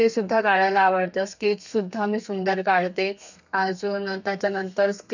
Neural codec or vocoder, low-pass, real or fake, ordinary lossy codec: codec, 32 kHz, 1.9 kbps, SNAC; 7.2 kHz; fake; none